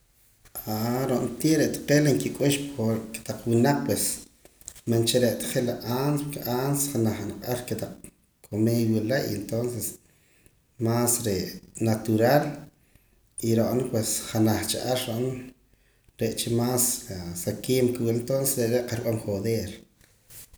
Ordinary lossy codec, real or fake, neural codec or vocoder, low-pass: none; real; none; none